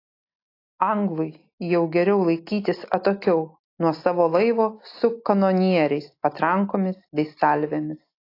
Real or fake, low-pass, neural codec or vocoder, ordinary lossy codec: real; 5.4 kHz; none; AAC, 32 kbps